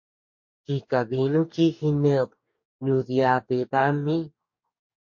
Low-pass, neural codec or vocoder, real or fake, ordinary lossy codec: 7.2 kHz; codec, 44.1 kHz, 2.6 kbps, DAC; fake; MP3, 48 kbps